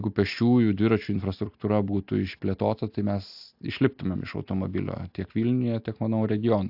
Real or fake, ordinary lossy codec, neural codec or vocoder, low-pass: real; AAC, 48 kbps; none; 5.4 kHz